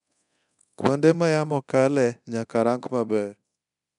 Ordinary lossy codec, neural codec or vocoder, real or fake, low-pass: none; codec, 24 kHz, 0.9 kbps, DualCodec; fake; 10.8 kHz